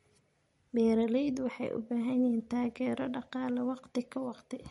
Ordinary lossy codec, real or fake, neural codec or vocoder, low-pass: MP3, 48 kbps; real; none; 19.8 kHz